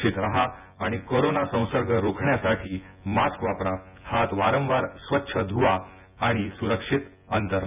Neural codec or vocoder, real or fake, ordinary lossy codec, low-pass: vocoder, 24 kHz, 100 mel bands, Vocos; fake; none; 3.6 kHz